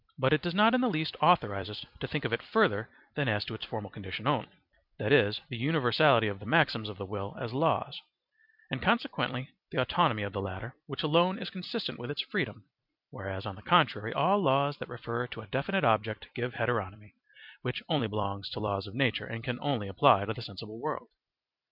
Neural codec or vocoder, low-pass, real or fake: vocoder, 44.1 kHz, 128 mel bands every 256 samples, BigVGAN v2; 5.4 kHz; fake